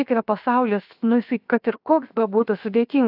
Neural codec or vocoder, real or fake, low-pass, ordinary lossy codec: codec, 16 kHz, 0.7 kbps, FocalCodec; fake; 5.4 kHz; AAC, 48 kbps